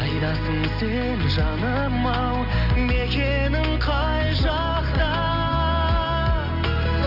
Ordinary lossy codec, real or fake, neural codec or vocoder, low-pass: none; real; none; 5.4 kHz